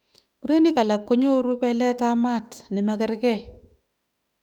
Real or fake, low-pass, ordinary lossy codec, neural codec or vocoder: fake; 19.8 kHz; none; autoencoder, 48 kHz, 32 numbers a frame, DAC-VAE, trained on Japanese speech